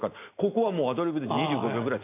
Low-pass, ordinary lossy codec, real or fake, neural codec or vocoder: 3.6 kHz; AAC, 24 kbps; real; none